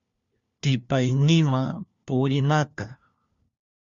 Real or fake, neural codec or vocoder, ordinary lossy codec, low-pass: fake; codec, 16 kHz, 1 kbps, FunCodec, trained on LibriTTS, 50 frames a second; Opus, 64 kbps; 7.2 kHz